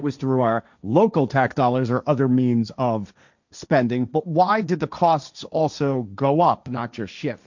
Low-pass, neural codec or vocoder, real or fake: 7.2 kHz; codec, 16 kHz, 1.1 kbps, Voila-Tokenizer; fake